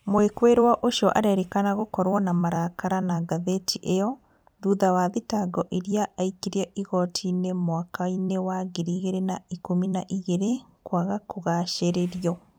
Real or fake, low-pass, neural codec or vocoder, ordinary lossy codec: fake; none; vocoder, 44.1 kHz, 128 mel bands every 256 samples, BigVGAN v2; none